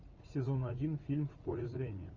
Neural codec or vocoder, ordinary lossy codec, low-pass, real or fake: vocoder, 44.1 kHz, 80 mel bands, Vocos; Opus, 32 kbps; 7.2 kHz; fake